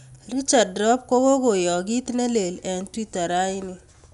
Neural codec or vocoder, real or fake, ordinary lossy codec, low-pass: none; real; none; 10.8 kHz